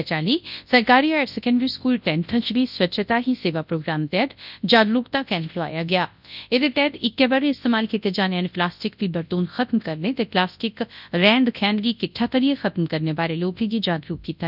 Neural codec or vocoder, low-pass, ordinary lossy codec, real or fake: codec, 24 kHz, 0.9 kbps, WavTokenizer, large speech release; 5.4 kHz; none; fake